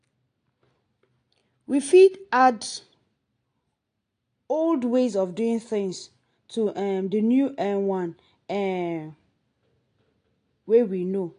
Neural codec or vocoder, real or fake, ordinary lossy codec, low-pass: none; real; AAC, 48 kbps; 9.9 kHz